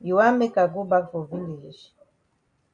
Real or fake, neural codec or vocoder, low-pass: real; none; 9.9 kHz